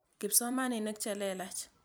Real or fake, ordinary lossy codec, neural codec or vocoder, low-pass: fake; none; vocoder, 44.1 kHz, 128 mel bands every 256 samples, BigVGAN v2; none